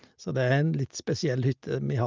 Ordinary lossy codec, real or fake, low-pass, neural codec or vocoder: Opus, 24 kbps; real; 7.2 kHz; none